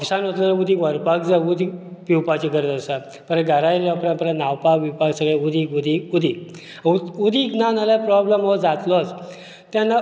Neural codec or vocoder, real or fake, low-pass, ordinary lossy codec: none; real; none; none